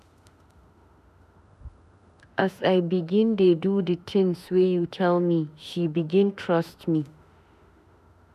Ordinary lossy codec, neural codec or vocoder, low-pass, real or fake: none; autoencoder, 48 kHz, 32 numbers a frame, DAC-VAE, trained on Japanese speech; 14.4 kHz; fake